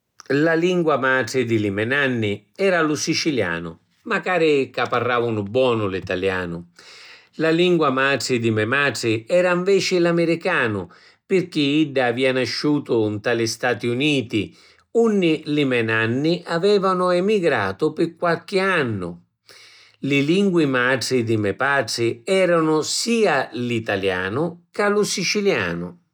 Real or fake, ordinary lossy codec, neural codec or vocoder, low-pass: real; none; none; 19.8 kHz